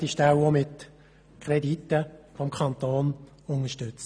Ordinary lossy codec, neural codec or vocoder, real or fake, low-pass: none; none; real; 9.9 kHz